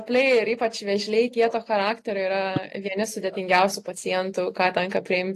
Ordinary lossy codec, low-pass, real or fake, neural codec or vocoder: AAC, 48 kbps; 14.4 kHz; real; none